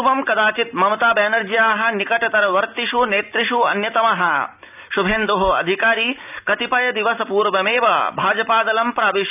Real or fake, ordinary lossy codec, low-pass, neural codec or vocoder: real; none; 3.6 kHz; none